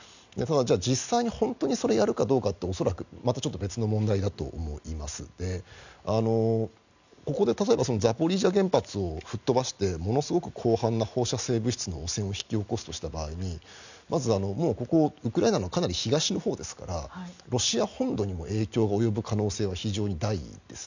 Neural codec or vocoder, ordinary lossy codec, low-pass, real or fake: none; none; 7.2 kHz; real